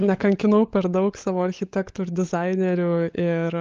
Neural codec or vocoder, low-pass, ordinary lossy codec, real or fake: codec, 16 kHz, 16 kbps, FunCodec, trained on LibriTTS, 50 frames a second; 7.2 kHz; Opus, 24 kbps; fake